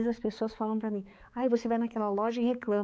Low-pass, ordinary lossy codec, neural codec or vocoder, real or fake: none; none; codec, 16 kHz, 4 kbps, X-Codec, HuBERT features, trained on balanced general audio; fake